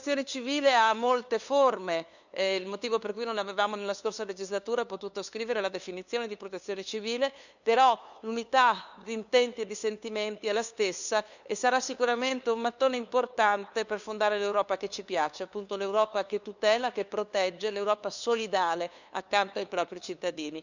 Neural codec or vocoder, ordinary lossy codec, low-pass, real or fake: codec, 16 kHz, 2 kbps, FunCodec, trained on LibriTTS, 25 frames a second; none; 7.2 kHz; fake